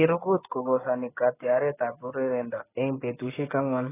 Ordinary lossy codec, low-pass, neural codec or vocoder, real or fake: AAC, 16 kbps; 3.6 kHz; codec, 16 kHz, 6 kbps, DAC; fake